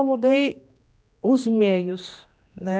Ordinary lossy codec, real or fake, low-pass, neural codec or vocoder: none; fake; none; codec, 16 kHz, 1 kbps, X-Codec, HuBERT features, trained on general audio